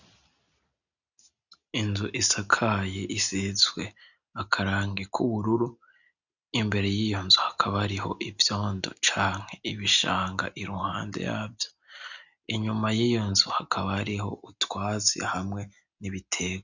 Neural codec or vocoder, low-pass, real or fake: none; 7.2 kHz; real